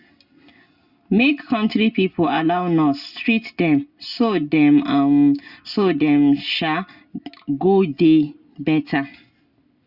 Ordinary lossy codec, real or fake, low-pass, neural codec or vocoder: Opus, 64 kbps; real; 5.4 kHz; none